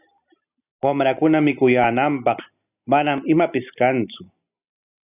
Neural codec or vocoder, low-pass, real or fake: none; 3.6 kHz; real